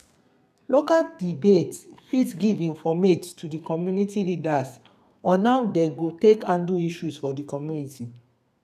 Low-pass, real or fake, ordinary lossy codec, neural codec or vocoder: 14.4 kHz; fake; none; codec, 32 kHz, 1.9 kbps, SNAC